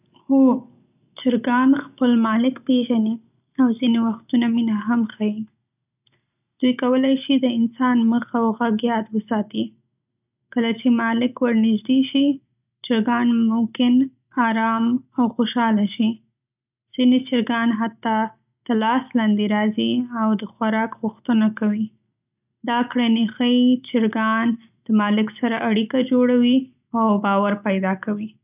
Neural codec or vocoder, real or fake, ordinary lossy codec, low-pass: none; real; none; 3.6 kHz